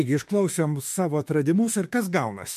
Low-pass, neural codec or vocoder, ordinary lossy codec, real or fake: 14.4 kHz; autoencoder, 48 kHz, 32 numbers a frame, DAC-VAE, trained on Japanese speech; MP3, 64 kbps; fake